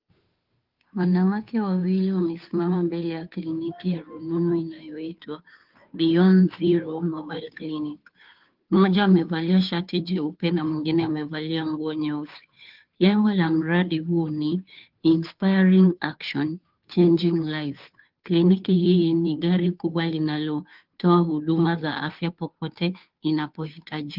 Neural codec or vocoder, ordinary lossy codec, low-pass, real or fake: codec, 16 kHz, 2 kbps, FunCodec, trained on Chinese and English, 25 frames a second; Opus, 32 kbps; 5.4 kHz; fake